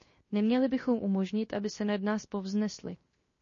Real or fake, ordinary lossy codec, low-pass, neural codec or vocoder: fake; MP3, 32 kbps; 7.2 kHz; codec, 16 kHz, 0.7 kbps, FocalCodec